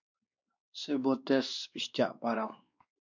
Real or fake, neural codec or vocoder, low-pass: fake; codec, 16 kHz, 2 kbps, X-Codec, WavLM features, trained on Multilingual LibriSpeech; 7.2 kHz